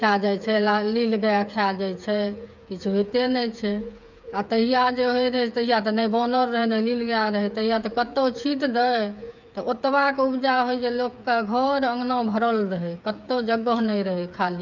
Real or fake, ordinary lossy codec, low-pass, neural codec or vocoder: fake; none; 7.2 kHz; codec, 16 kHz, 8 kbps, FreqCodec, smaller model